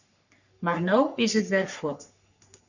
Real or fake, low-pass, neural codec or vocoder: fake; 7.2 kHz; codec, 44.1 kHz, 3.4 kbps, Pupu-Codec